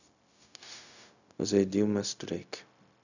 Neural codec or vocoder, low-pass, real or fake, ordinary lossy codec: codec, 16 kHz, 0.4 kbps, LongCat-Audio-Codec; 7.2 kHz; fake; none